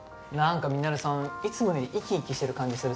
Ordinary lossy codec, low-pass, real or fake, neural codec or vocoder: none; none; real; none